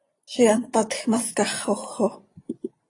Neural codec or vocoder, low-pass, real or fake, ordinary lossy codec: vocoder, 24 kHz, 100 mel bands, Vocos; 10.8 kHz; fake; AAC, 32 kbps